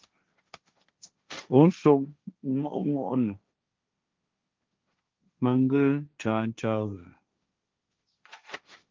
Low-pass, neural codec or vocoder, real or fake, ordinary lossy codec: 7.2 kHz; codec, 16 kHz, 1.1 kbps, Voila-Tokenizer; fake; Opus, 24 kbps